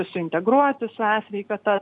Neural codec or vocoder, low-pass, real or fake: none; 10.8 kHz; real